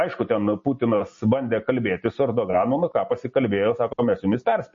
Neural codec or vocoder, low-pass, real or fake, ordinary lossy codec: none; 7.2 kHz; real; MP3, 32 kbps